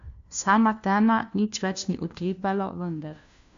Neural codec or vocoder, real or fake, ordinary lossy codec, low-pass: codec, 16 kHz, 1 kbps, FunCodec, trained on LibriTTS, 50 frames a second; fake; MP3, 64 kbps; 7.2 kHz